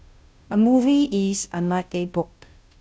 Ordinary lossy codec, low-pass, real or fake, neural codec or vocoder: none; none; fake; codec, 16 kHz, 0.5 kbps, FunCodec, trained on Chinese and English, 25 frames a second